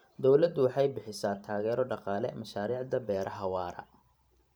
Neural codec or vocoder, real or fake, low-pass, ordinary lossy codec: vocoder, 44.1 kHz, 128 mel bands every 512 samples, BigVGAN v2; fake; none; none